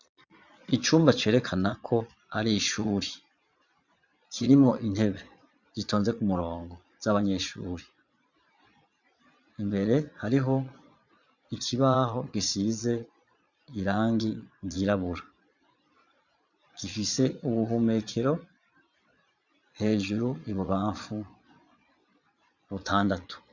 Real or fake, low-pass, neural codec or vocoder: fake; 7.2 kHz; vocoder, 22.05 kHz, 80 mel bands, Vocos